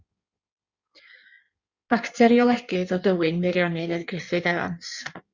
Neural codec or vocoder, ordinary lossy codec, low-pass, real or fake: codec, 16 kHz in and 24 kHz out, 1.1 kbps, FireRedTTS-2 codec; Opus, 64 kbps; 7.2 kHz; fake